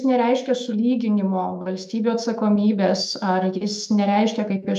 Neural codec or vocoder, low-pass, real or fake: autoencoder, 48 kHz, 128 numbers a frame, DAC-VAE, trained on Japanese speech; 14.4 kHz; fake